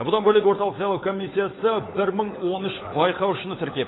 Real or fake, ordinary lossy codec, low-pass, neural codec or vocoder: fake; AAC, 16 kbps; 7.2 kHz; codec, 16 kHz, 16 kbps, FunCodec, trained on LibriTTS, 50 frames a second